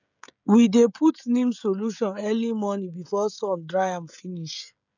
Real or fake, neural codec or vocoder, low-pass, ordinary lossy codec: fake; codec, 16 kHz, 16 kbps, FreqCodec, smaller model; 7.2 kHz; none